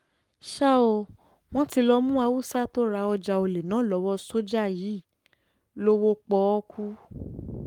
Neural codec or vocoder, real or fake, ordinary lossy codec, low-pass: codec, 44.1 kHz, 7.8 kbps, Pupu-Codec; fake; Opus, 32 kbps; 19.8 kHz